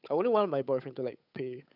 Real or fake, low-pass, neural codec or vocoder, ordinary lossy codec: fake; 5.4 kHz; codec, 16 kHz, 8 kbps, FunCodec, trained on Chinese and English, 25 frames a second; none